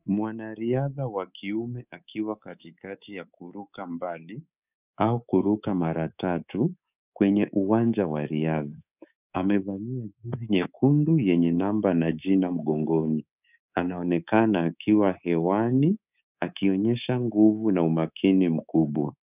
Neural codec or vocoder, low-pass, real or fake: codec, 24 kHz, 3.1 kbps, DualCodec; 3.6 kHz; fake